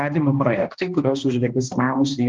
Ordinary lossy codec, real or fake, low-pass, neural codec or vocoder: Opus, 24 kbps; fake; 7.2 kHz; codec, 16 kHz, 1 kbps, X-Codec, HuBERT features, trained on balanced general audio